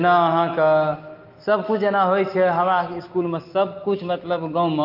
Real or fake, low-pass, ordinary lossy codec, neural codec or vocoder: real; 5.4 kHz; Opus, 24 kbps; none